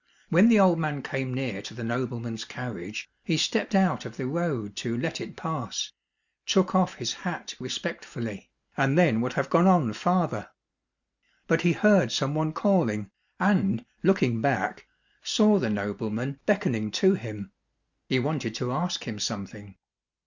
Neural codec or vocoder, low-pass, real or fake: none; 7.2 kHz; real